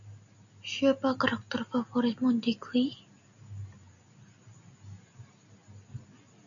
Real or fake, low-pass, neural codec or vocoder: real; 7.2 kHz; none